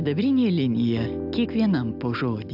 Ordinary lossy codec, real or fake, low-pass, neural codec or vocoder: AAC, 48 kbps; real; 5.4 kHz; none